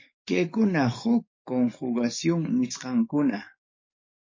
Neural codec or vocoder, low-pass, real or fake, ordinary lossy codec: codec, 44.1 kHz, 7.8 kbps, DAC; 7.2 kHz; fake; MP3, 32 kbps